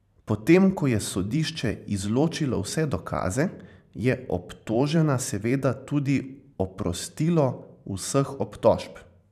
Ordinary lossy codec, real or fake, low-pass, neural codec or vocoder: none; fake; 14.4 kHz; vocoder, 44.1 kHz, 128 mel bands every 512 samples, BigVGAN v2